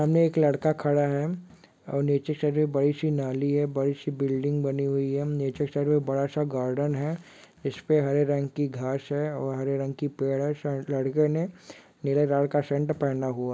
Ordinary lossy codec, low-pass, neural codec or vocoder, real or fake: none; none; none; real